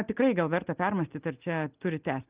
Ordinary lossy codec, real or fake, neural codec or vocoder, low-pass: Opus, 32 kbps; real; none; 3.6 kHz